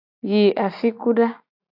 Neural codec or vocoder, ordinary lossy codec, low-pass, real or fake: none; AAC, 48 kbps; 5.4 kHz; real